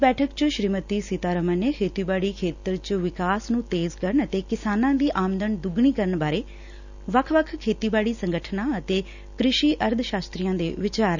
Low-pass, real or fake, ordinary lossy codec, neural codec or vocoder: 7.2 kHz; real; none; none